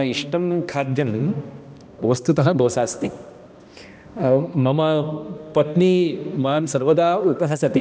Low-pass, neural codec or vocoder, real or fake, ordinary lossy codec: none; codec, 16 kHz, 1 kbps, X-Codec, HuBERT features, trained on balanced general audio; fake; none